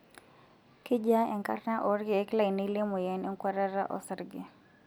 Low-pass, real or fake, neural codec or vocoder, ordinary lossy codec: none; real; none; none